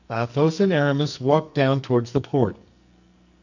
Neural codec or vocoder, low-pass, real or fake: codec, 44.1 kHz, 2.6 kbps, SNAC; 7.2 kHz; fake